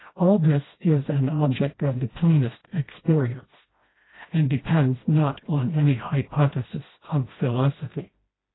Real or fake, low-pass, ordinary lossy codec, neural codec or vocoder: fake; 7.2 kHz; AAC, 16 kbps; codec, 16 kHz, 1 kbps, FreqCodec, smaller model